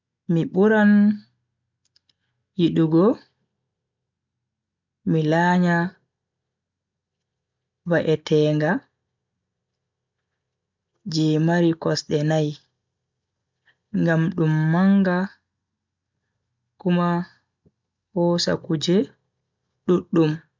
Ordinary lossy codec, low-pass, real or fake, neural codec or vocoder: MP3, 64 kbps; 7.2 kHz; real; none